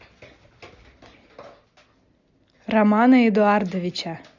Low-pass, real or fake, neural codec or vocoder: 7.2 kHz; real; none